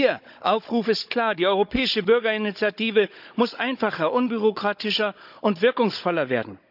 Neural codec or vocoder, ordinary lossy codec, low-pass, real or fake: codec, 16 kHz, 16 kbps, FunCodec, trained on Chinese and English, 50 frames a second; none; 5.4 kHz; fake